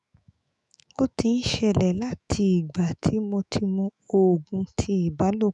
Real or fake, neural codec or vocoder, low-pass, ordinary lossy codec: fake; autoencoder, 48 kHz, 128 numbers a frame, DAC-VAE, trained on Japanese speech; 10.8 kHz; none